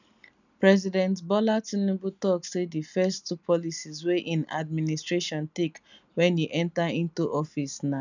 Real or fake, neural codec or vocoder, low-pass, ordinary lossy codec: real; none; 7.2 kHz; none